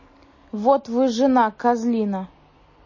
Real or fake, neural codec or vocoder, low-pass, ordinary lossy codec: real; none; 7.2 kHz; MP3, 32 kbps